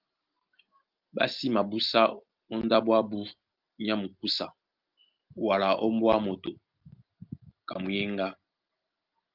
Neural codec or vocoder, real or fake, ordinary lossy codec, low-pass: none; real; Opus, 24 kbps; 5.4 kHz